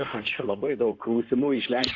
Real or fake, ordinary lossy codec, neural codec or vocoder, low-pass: fake; Opus, 64 kbps; codec, 16 kHz in and 24 kHz out, 2.2 kbps, FireRedTTS-2 codec; 7.2 kHz